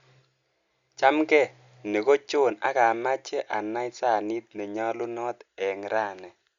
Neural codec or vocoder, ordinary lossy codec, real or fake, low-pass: none; Opus, 64 kbps; real; 7.2 kHz